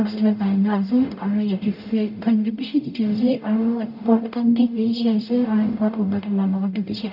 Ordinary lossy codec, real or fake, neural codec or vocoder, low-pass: none; fake; codec, 44.1 kHz, 0.9 kbps, DAC; 5.4 kHz